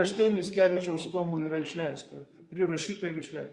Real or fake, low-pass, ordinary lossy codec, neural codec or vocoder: fake; 10.8 kHz; Opus, 64 kbps; codec, 24 kHz, 1 kbps, SNAC